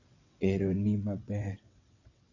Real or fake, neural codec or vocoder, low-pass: fake; vocoder, 22.05 kHz, 80 mel bands, WaveNeXt; 7.2 kHz